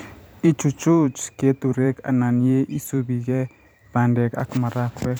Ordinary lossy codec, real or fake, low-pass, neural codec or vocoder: none; fake; none; vocoder, 44.1 kHz, 128 mel bands every 512 samples, BigVGAN v2